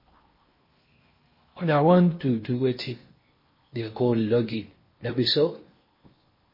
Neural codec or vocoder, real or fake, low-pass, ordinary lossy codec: codec, 16 kHz in and 24 kHz out, 0.8 kbps, FocalCodec, streaming, 65536 codes; fake; 5.4 kHz; MP3, 24 kbps